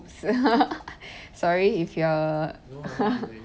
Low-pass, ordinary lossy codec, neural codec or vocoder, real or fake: none; none; none; real